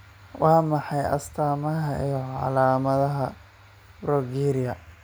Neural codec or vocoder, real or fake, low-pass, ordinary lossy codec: none; real; none; none